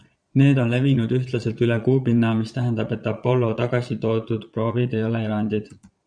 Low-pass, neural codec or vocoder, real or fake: 9.9 kHz; vocoder, 22.05 kHz, 80 mel bands, Vocos; fake